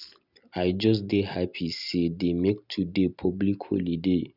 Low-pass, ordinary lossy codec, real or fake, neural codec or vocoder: 5.4 kHz; none; real; none